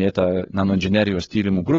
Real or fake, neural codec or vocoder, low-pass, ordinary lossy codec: fake; codec, 16 kHz, 8 kbps, FreqCodec, larger model; 7.2 kHz; AAC, 24 kbps